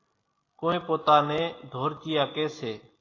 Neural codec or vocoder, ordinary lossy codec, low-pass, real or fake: none; AAC, 32 kbps; 7.2 kHz; real